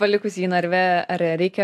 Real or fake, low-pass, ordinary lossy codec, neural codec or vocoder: real; 14.4 kHz; AAC, 96 kbps; none